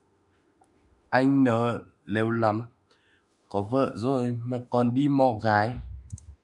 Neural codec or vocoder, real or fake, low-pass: autoencoder, 48 kHz, 32 numbers a frame, DAC-VAE, trained on Japanese speech; fake; 10.8 kHz